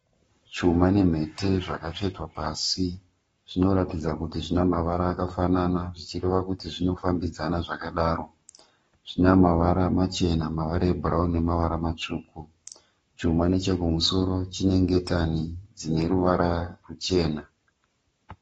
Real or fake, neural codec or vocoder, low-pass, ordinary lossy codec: fake; codec, 44.1 kHz, 7.8 kbps, Pupu-Codec; 19.8 kHz; AAC, 24 kbps